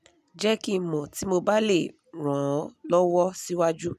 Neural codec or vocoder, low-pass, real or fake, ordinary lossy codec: none; 14.4 kHz; real; none